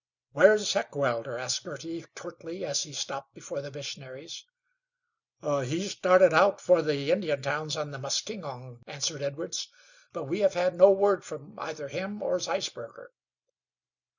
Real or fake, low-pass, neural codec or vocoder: real; 7.2 kHz; none